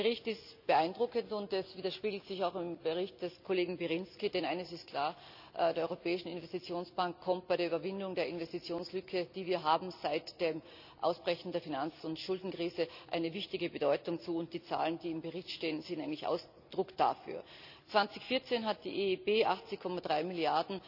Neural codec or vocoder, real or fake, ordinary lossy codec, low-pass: none; real; none; 5.4 kHz